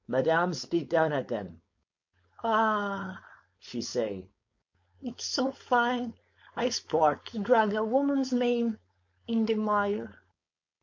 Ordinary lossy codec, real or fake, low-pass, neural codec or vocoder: MP3, 48 kbps; fake; 7.2 kHz; codec, 16 kHz, 4.8 kbps, FACodec